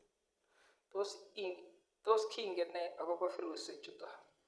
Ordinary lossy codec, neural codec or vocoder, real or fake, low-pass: none; vocoder, 22.05 kHz, 80 mel bands, Vocos; fake; none